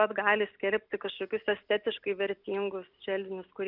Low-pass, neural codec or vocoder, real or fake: 5.4 kHz; none; real